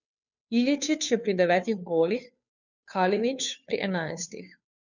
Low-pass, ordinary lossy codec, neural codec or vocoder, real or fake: 7.2 kHz; none; codec, 16 kHz, 2 kbps, FunCodec, trained on Chinese and English, 25 frames a second; fake